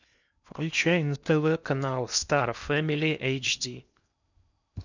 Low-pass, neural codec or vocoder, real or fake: 7.2 kHz; codec, 16 kHz in and 24 kHz out, 0.8 kbps, FocalCodec, streaming, 65536 codes; fake